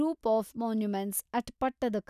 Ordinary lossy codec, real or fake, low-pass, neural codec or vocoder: none; real; 14.4 kHz; none